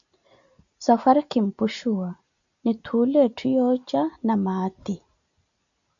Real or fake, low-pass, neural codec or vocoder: real; 7.2 kHz; none